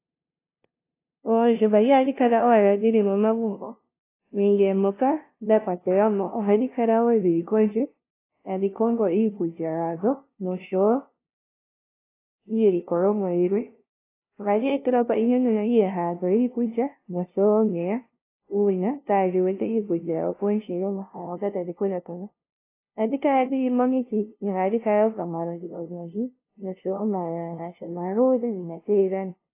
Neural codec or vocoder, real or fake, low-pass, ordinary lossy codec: codec, 16 kHz, 0.5 kbps, FunCodec, trained on LibriTTS, 25 frames a second; fake; 3.6 kHz; AAC, 24 kbps